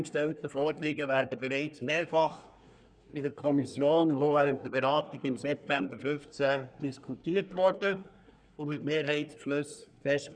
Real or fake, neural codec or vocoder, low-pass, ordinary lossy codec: fake; codec, 24 kHz, 1 kbps, SNAC; 9.9 kHz; none